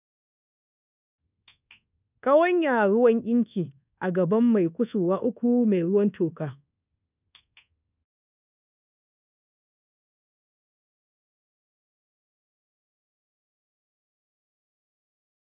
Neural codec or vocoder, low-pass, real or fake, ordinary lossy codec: codec, 24 kHz, 1.2 kbps, DualCodec; 3.6 kHz; fake; none